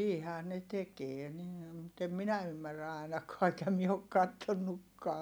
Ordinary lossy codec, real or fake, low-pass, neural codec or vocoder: none; real; none; none